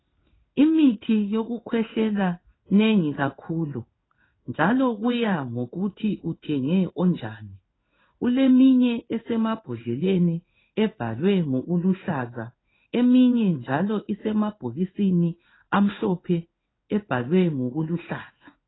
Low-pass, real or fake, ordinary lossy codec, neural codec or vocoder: 7.2 kHz; fake; AAC, 16 kbps; codec, 16 kHz in and 24 kHz out, 1 kbps, XY-Tokenizer